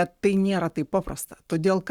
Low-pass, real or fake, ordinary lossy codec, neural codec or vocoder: 19.8 kHz; fake; Opus, 64 kbps; codec, 44.1 kHz, 7.8 kbps, Pupu-Codec